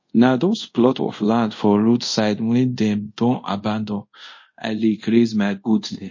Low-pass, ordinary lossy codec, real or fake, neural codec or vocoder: 7.2 kHz; MP3, 32 kbps; fake; codec, 24 kHz, 0.5 kbps, DualCodec